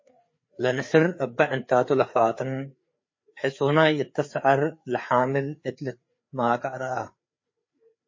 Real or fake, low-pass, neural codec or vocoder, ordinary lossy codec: fake; 7.2 kHz; codec, 16 kHz, 4 kbps, FreqCodec, larger model; MP3, 32 kbps